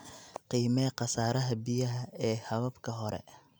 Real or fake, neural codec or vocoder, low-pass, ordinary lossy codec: real; none; none; none